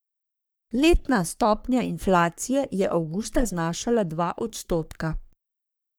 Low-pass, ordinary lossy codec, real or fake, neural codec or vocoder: none; none; fake; codec, 44.1 kHz, 3.4 kbps, Pupu-Codec